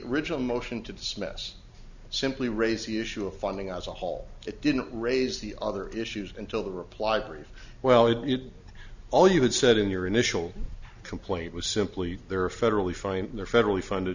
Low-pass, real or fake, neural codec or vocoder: 7.2 kHz; real; none